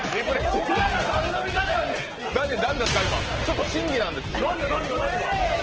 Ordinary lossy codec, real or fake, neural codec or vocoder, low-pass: Opus, 16 kbps; real; none; 7.2 kHz